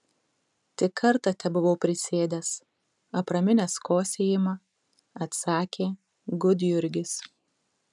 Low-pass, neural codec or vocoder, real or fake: 10.8 kHz; none; real